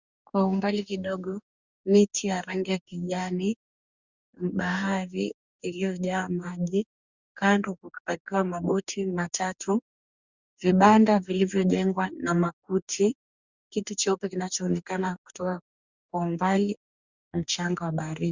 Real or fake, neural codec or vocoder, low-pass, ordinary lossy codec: fake; codec, 44.1 kHz, 2.6 kbps, DAC; 7.2 kHz; Opus, 64 kbps